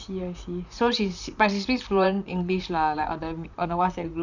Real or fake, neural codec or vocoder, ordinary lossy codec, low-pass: fake; vocoder, 44.1 kHz, 128 mel bands, Pupu-Vocoder; none; 7.2 kHz